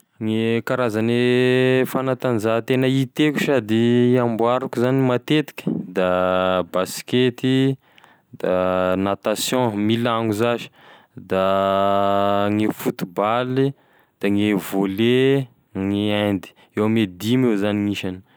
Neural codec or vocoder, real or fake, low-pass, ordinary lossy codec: none; real; none; none